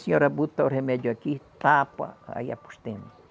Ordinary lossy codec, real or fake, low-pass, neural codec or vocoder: none; real; none; none